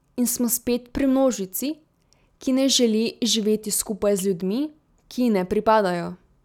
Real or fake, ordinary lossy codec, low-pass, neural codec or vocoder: real; none; 19.8 kHz; none